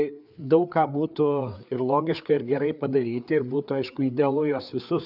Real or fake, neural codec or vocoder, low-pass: fake; codec, 16 kHz, 4 kbps, FreqCodec, larger model; 5.4 kHz